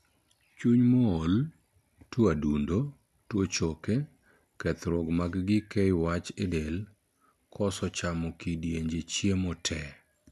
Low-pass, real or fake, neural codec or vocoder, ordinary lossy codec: 14.4 kHz; real; none; none